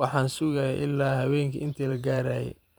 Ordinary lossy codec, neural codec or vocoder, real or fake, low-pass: none; vocoder, 44.1 kHz, 128 mel bands every 512 samples, BigVGAN v2; fake; none